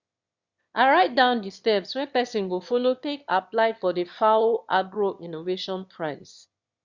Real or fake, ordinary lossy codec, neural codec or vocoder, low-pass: fake; Opus, 64 kbps; autoencoder, 22.05 kHz, a latent of 192 numbers a frame, VITS, trained on one speaker; 7.2 kHz